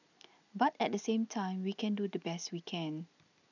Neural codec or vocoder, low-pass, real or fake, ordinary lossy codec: none; 7.2 kHz; real; none